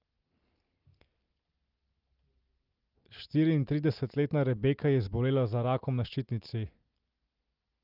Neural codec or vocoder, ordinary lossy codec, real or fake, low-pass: none; Opus, 24 kbps; real; 5.4 kHz